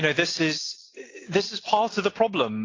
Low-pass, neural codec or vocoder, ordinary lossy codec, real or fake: 7.2 kHz; none; AAC, 32 kbps; real